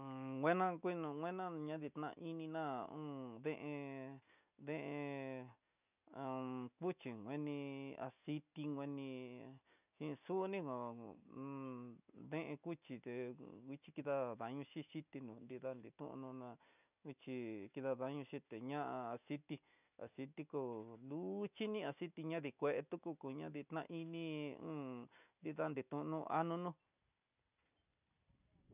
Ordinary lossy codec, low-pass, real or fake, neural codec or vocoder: none; 3.6 kHz; real; none